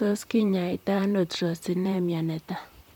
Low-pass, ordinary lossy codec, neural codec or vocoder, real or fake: 19.8 kHz; none; vocoder, 44.1 kHz, 128 mel bands, Pupu-Vocoder; fake